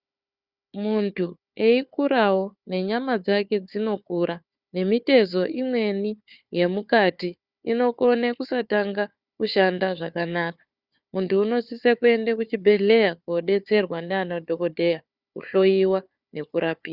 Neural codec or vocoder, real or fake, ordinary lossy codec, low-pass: codec, 16 kHz, 4 kbps, FunCodec, trained on Chinese and English, 50 frames a second; fake; Opus, 64 kbps; 5.4 kHz